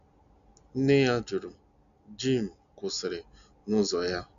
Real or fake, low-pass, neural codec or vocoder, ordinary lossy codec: real; 7.2 kHz; none; none